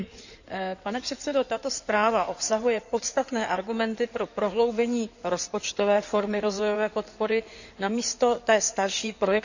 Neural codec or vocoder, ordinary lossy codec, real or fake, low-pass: codec, 16 kHz in and 24 kHz out, 2.2 kbps, FireRedTTS-2 codec; none; fake; 7.2 kHz